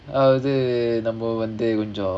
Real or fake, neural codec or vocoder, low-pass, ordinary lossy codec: real; none; 9.9 kHz; none